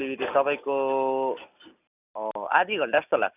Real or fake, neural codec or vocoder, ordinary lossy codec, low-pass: real; none; none; 3.6 kHz